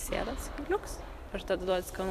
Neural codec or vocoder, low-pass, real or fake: vocoder, 44.1 kHz, 128 mel bands every 256 samples, BigVGAN v2; 14.4 kHz; fake